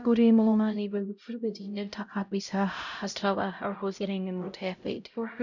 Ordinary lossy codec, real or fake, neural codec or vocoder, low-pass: none; fake; codec, 16 kHz, 0.5 kbps, X-Codec, HuBERT features, trained on LibriSpeech; 7.2 kHz